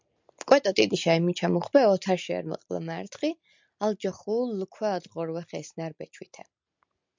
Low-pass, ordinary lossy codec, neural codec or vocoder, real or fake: 7.2 kHz; MP3, 64 kbps; none; real